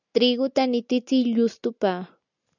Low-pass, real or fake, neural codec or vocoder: 7.2 kHz; real; none